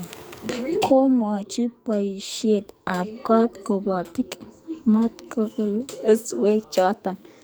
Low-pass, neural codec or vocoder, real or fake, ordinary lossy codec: none; codec, 44.1 kHz, 2.6 kbps, SNAC; fake; none